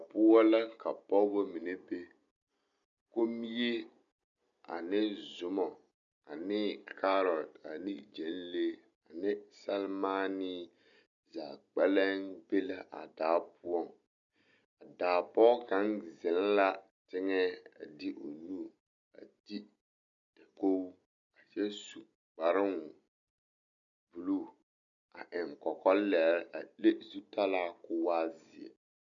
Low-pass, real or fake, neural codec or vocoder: 7.2 kHz; real; none